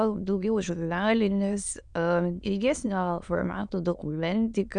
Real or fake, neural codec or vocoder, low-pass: fake; autoencoder, 22.05 kHz, a latent of 192 numbers a frame, VITS, trained on many speakers; 9.9 kHz